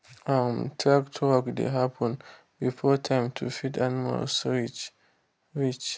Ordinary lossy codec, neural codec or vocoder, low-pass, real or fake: none; none; none; real